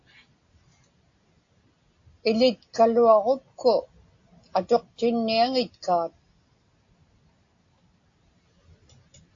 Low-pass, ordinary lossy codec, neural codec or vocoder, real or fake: 7.2 kHz; AAC, 48 kbps; none; real